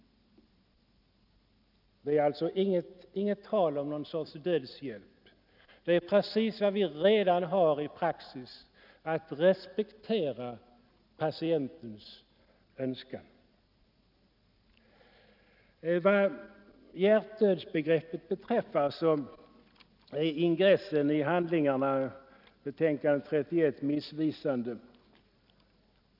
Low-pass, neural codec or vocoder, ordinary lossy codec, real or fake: 5.4 kHz; none; none; real